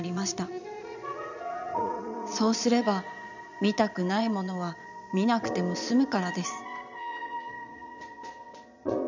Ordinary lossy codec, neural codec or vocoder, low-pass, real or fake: none; vocoder, 22.05 kHz, 80 mel bands, Vocos; 7.2 kHz; fake